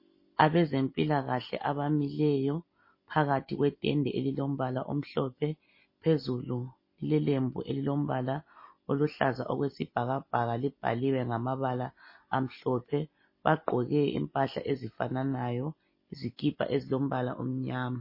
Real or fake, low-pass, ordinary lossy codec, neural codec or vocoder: real; 5.4 kHz; MP3, 24 kbps; none